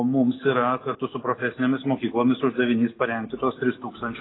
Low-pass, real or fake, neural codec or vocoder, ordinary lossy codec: 7.2 kHz; real; none; AAC, 16 kbps